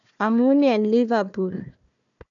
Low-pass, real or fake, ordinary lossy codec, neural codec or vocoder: 7.2 kHz; fake; none; codec, 16 kHz, 1 kbps, FunCodec, trained on Chinese and English, 50 frames a second